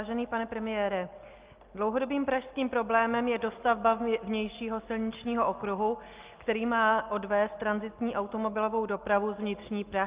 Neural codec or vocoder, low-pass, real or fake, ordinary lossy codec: none; 3.6 kHz; real; Opus, 32 kbps